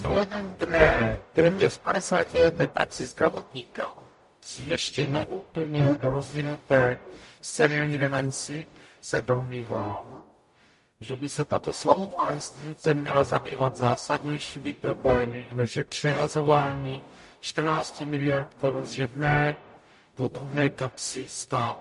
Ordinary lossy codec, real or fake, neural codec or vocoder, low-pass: MP3, 48 kbps; fake; codec, 44.1 kHz, 0.9 kbps, DAC; 14.4 kHz